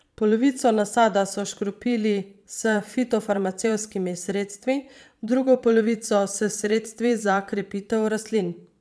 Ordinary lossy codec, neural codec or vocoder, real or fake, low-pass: none; vocoder, 22.05 kHz, 80 mel bands, Vocos; fake; none